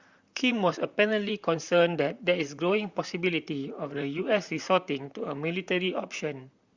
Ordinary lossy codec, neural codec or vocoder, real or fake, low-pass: Opus, 64 kbps; vocoder, 44.1 kHz, 128 mel bands, Pupu-Vocoder; fake; 7.2 kHz